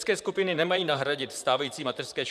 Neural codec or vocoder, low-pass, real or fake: vocoder, 44.1 kHz, 128 mel bands, Pupu-Vocoder; 14.4 kHz; fake